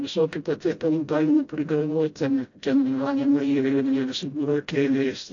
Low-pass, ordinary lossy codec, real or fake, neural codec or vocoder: 7.2 kHz; AAC, 48 kbps; fake; codec, 16 kHz, 0.5 kbps, FreqCodec, smaller model